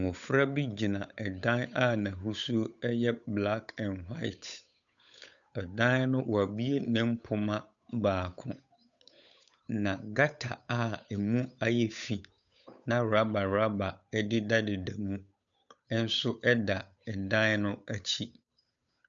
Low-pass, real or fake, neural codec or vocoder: 7.2 kHz; fake; codec, 16 kHz, 8 kbps, FunCodec, trained on Chinese and English, 25 frames a second